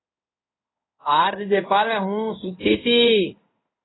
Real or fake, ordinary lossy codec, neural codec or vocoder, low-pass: fake; AAC, 16 kbps; codec, 16 kHz in and 24 kHz out, 1 kbps, XY-Tokenizer; 7.2 kHz